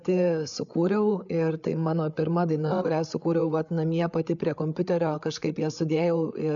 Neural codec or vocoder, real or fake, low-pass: codec, 16 kHz, 8 kbps, FreqCodec, larger model; fake; 7.2 kHz